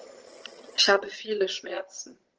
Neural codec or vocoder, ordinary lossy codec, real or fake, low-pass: vocoder, 22.05 kHz, 80 mel bands, HiFi-GAN; Opus, 16 kbps; fake; 7.2 kHz